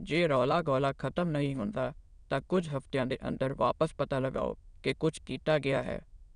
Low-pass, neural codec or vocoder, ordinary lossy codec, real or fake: 9.9 kHz; autoencoder, 22.05 kHz, a latent of 192 numbers a frame, VITS, trained on many speakers; none; fake